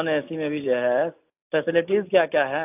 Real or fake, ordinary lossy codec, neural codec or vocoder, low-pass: real; AAC, 32 kbps; none; 3.6 kHz